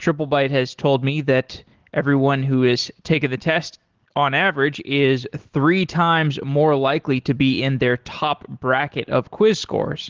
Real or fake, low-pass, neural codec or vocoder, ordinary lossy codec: real; 7.2 kHz; none; Opus, 16 kbps